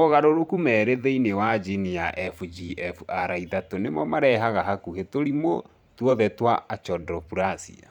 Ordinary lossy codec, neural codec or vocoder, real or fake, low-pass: none; vocoder, 44.1 kHz, 128 mel bands every 512 samples, BigVGAN v2; fake; 19.8 kHz